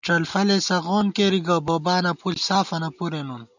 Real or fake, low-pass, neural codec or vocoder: real; 7.2 kHz; none